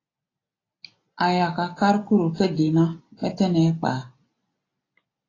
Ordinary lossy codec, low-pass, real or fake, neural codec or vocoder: AAC, 32 kbps; 7.2 kHz; real; none